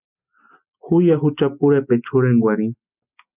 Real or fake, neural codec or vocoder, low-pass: real; none; 3.6 kHz